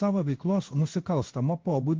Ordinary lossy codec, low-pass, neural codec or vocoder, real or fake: Opus, 16 kbps; 7.2 kHz; codec, 16 kHz in and 24 kHz out, 1 kbps, XY-Tokenizer; fake